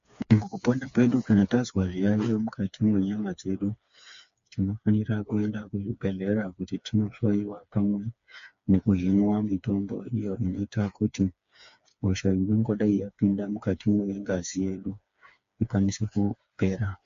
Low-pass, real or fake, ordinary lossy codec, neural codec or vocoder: 7.2 kHz; fake; MP3, 48 kbps; codec, 16 kHz, 4 kbps, FreqCodec, smaller model